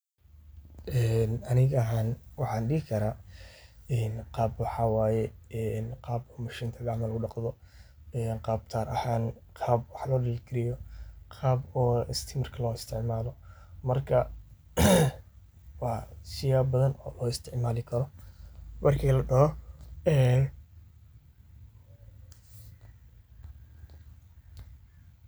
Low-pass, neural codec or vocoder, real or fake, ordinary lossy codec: none; none; real; none